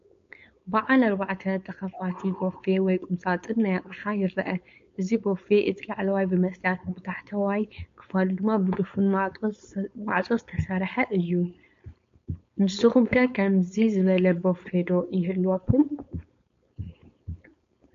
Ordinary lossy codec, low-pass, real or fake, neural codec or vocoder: MP3, 48 kbps; 7.2 kHz; fake; codec, 16 kHz, 4.8 kbps, FACodec